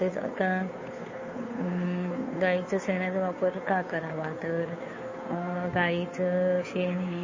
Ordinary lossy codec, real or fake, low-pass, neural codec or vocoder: MP3, 32 kbps; fake; 7.2 kHz; codec, 16 kHz, 2 kbps, FunCodec, trained on Chinese and English, 25 frames a second